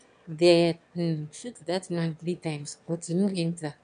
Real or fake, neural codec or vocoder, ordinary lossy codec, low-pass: fake; autoencoder, 22.05 kHz, a latent of 192 numbers a frame, VITS, trained on one speaker; AAC, 96 kbps; 9.9 kHz